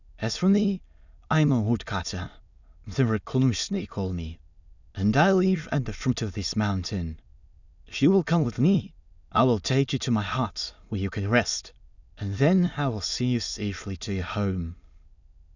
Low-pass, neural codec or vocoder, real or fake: 7.2 kHz; autoencoder, 22.05 kHz, a latent of 192 numbers a frame, VITS, trained on many speakers; fake